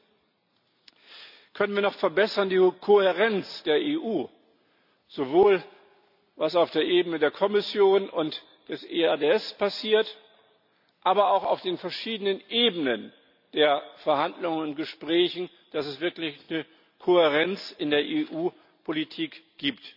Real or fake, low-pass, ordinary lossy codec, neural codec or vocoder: real; 5.4 kHz; none; none